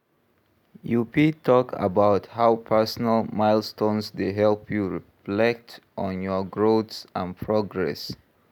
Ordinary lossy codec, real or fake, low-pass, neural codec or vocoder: none; real; 19.8 kHz; none